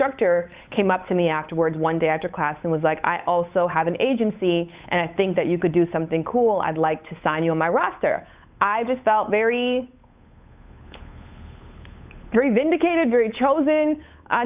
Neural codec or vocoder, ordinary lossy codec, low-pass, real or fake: codec, 16 kHz, 8 kbps, FunCodec, trained on Chinese and English, 25 frames a second; Opus, 64 kbps; 3.6 kHz; fake